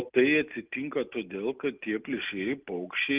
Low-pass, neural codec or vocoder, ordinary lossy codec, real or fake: 3.6 kHz; none; Opus, 32 kbps; real